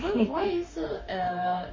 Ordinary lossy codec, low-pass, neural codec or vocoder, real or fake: MP3, 32 kbps; 7.2 kHz; autoencoder, 48 kHz, 32 numbers a frame, DAC-VAE, trained on Japanese speech; fake